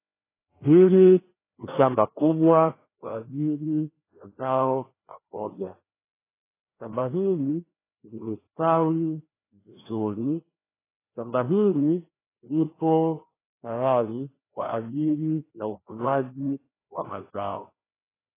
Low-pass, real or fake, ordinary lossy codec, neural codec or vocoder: 3.6 kHz; fake; AAC, 16 kbps; codec, 16 kHz, 1 kbps, FreqCodec, larger model